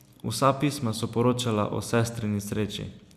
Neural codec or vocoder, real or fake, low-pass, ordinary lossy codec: none; real; 14.4 kHz; none